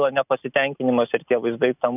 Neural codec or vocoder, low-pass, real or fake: none; 3.6 kHz; real